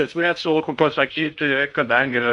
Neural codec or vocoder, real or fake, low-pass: codec, 16 kHz in and 24 kHz out, 0.6 kbps, FocalCodec, streaming, 2048 codes; fake; 10.8 kHz